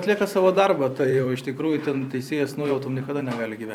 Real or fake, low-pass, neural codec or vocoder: fake; 19.8 kHz; vocoder, 44.1 kHz, 128 mel bands every 512 samples, BigVGAN v2